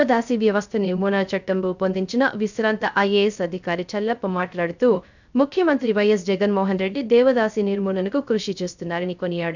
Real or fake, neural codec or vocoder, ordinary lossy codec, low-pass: fake; codec, 16 kHz, 0.3 kbps, FocalCodec; none; 7.2 kHz